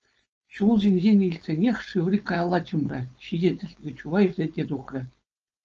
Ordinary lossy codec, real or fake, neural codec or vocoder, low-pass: Opus, 24 kbps; fake; codec, 16 kHz, 4.8 kbps, FACodec; 7.2 kHz